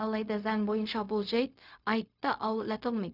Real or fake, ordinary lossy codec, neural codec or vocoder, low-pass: fake; none; codec, 16 kHz, 0.4 kbps, LongCat-Audio-Codec; 5.4 kHz